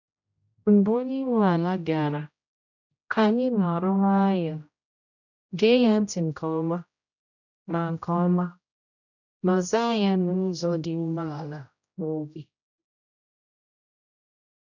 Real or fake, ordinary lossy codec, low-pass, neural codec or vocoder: fake; none; 7.2 kHz; codec, 16 kHz, 0.5 kbps, X-Codec, HuBERT features, trained on general audio